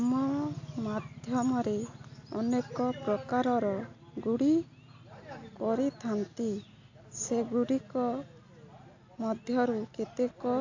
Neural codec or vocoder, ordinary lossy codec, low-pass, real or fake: none; AAC, 48 kbps; 7.2 kHz; real